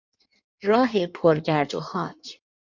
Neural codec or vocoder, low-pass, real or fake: codec, 16 kHz in and 24 kHz out, 1.1 kbps, FireRedTTS-2 codec; 7.2 kHz; fake